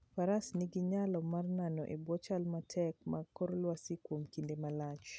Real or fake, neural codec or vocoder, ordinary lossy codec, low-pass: real; none; none; none